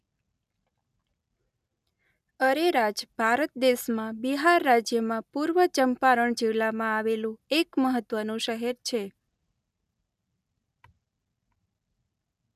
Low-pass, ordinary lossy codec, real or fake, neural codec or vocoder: 14.4 kHz; none; real; none